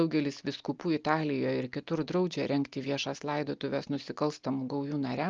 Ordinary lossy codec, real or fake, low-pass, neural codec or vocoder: Opus, 24 kbps; real; 7.2 kHz; none